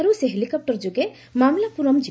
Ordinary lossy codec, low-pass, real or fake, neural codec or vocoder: none; none; real; none